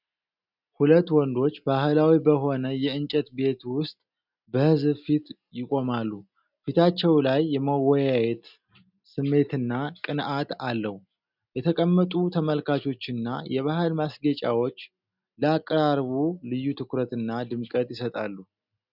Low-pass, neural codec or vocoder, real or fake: 5.4 kHz; none; real